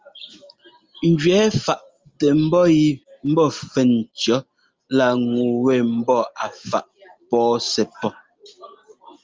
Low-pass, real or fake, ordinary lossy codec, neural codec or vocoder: 7.2 kHz; real; Opus, 32 kbps; none